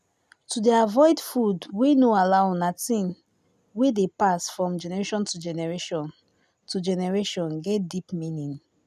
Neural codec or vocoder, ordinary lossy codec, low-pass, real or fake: none; none; 14.4 kHz; real